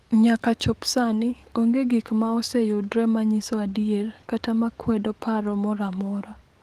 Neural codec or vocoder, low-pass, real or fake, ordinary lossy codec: codec, 44.1 kHz, 7.8 kbps, DAC; 14.4 kHz; fake; Opus, 24 kbps